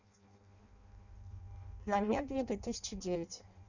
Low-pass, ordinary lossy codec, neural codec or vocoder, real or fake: 7.2 kHz; none; codec, 16 kHz in and 24 kHz out, 0.6 kbps, FireRedTTS-2 codec; fake